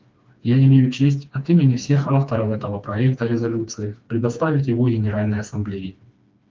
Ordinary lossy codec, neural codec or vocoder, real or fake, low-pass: Opus, 24 kbps; codec, 16 kHz, 2 kbps, FreqCodec, smaller model; fake; 7.2 kHz